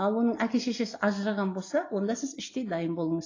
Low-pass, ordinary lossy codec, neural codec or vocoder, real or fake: 7.2 kHz; AAC, 32 kbps; none; real